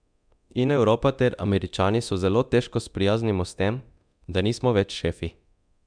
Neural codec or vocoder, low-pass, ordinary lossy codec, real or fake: codec, 24 kHz, 0.9 kbps, DualCodec; 9.9 kHz; MP3, 96 kbps; fake